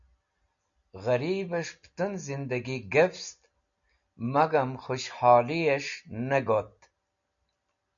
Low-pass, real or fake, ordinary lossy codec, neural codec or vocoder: 7.2 kHz; real; MP3, 96 kbps; none